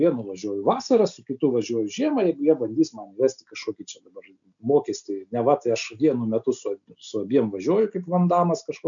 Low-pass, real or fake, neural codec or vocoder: 7.2 kHz; real; none